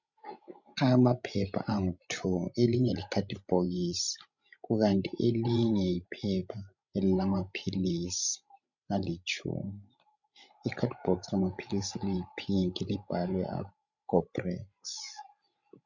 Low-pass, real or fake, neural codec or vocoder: 7.2 kHz; fake; codec, 16 kHz, 16 kbps, FreqCodec, larger model